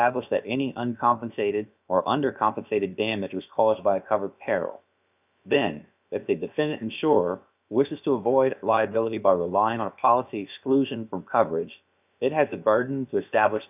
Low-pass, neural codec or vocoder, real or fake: 3.6 kHz; codec, 16 kHz, about 1 kbps, DyCAST, with the encoder's durations; fake